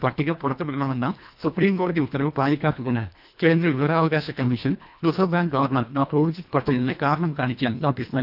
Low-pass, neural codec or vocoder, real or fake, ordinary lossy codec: 5.4 kHz; codec, 24 kHz, 1.5 kbps, HILCodec; fake; none